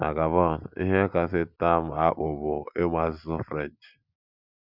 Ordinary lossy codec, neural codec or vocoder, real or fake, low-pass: none; none; real; 5.4 kHz